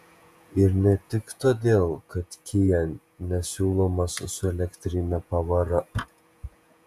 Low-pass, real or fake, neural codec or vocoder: 14.4 kHz; fake; vocoder, 48 kHz, 128 mel bands, Vocos